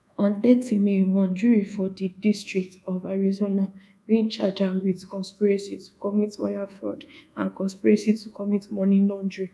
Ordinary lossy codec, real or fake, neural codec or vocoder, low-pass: none; fake; codec, 24 kHz, 1.2 kbps, DualCodec; none